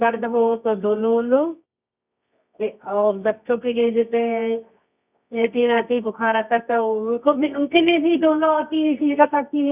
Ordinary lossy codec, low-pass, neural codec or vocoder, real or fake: none; 3.6 kHz; codec, 24 kHz, 0.9 kbps, WavTokenizer, medium music audio release; fake